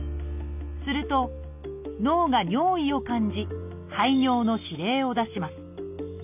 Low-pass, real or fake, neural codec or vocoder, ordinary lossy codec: 3.6 kHz; real; none; none